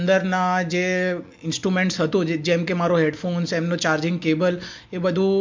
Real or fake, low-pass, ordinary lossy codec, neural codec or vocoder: real; 7.2 kHz; MP3, 48 kbps; none